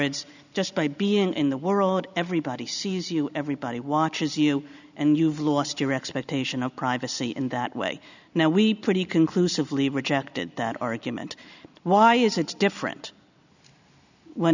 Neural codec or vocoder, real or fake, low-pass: none; real; 7.2 kHz